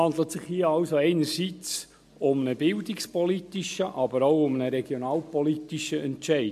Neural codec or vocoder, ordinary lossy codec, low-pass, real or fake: none; MP3, 64 kbps; 14.4 kHz; real